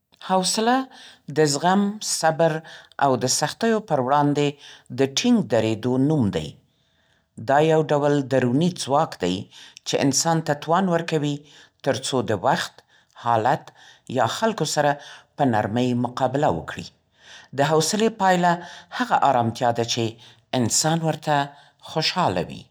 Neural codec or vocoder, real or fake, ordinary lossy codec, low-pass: none; real; none; none